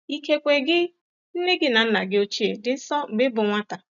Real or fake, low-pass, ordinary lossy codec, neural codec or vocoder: real; 7.2 kHz; none; none